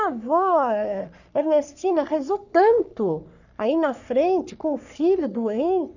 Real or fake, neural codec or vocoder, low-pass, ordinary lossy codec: fake; codec, 44.1 kHz, 3.4 kbps, Pupu-Codec; 7.2 kHz; none